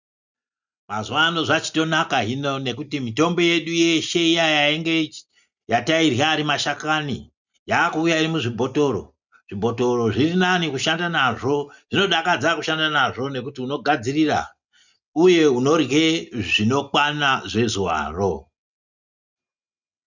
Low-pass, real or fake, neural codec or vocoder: 7.2 kHz; real; none